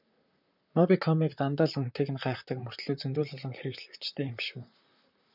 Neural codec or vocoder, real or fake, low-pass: vocoder, 44.1 kHz, 128 mel bands, Pupu-Vocoder; fake; 5.4 kHz